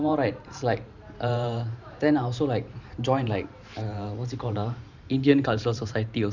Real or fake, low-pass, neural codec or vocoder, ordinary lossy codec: fake; 7.2 kHz; vocoder, 44.1 kHz, 128 mel bands every 512 samples, BigVGAN v2; none